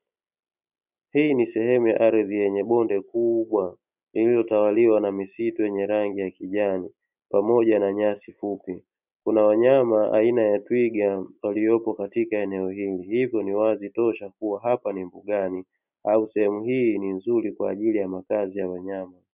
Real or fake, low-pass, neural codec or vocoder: real; 3.6 kHz; none